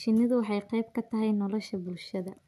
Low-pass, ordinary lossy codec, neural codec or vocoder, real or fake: 14.4 kHz; none; none; real